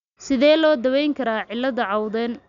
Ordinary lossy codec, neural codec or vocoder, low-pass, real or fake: none; none; 7.2 kHz; real